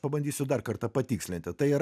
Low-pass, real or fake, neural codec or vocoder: 14.4 kHz; real; none